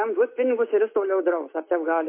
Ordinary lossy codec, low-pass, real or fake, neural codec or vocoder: MP3, 24 kbps; 3.6 kHz; real; none